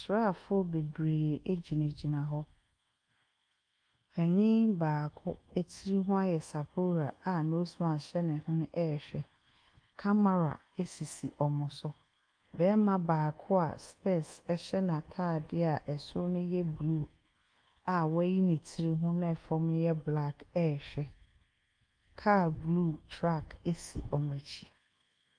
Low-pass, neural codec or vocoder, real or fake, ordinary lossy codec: 9.9 kHz; codec, 24 kHz, 1.2 kbps, DualCodec; fake; Opus, 32 kbps